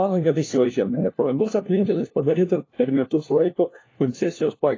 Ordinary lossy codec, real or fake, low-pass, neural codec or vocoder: AAC, 32 kbps; fake; 7.2 kHz; codec, 16 kHz, 1 kbps, FunCodec, trained on LibriTTS, 50 frames a second